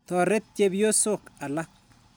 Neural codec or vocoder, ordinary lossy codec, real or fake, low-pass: none; none; real; none